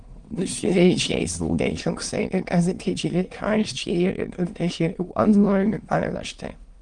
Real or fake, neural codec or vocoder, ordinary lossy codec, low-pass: fake; autoencoder, 22.05 kHz, a latent of 192 numbers a frame, VITS, trained on many speakers; Opus, 24 kbps; 9.9 kHz